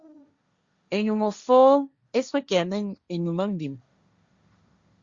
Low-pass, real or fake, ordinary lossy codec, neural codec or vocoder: 7.2 kHz; fake; Opus, 64 kbps; codec, 16 kHz, 1.1 kbps, Voila-Tokenizer